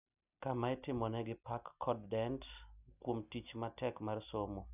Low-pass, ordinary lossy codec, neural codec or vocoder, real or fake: 3.6 kHz; none; none; real